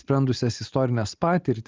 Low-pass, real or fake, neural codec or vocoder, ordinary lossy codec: 7.2 kHz; real; none; Opus, 32 kbps